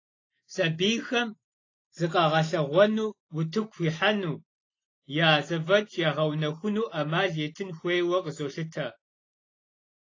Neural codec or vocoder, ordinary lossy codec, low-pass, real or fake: none; AAC, 32 kbps; 7.2 kHz; real